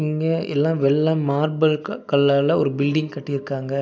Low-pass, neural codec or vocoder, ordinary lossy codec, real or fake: none; none; none; real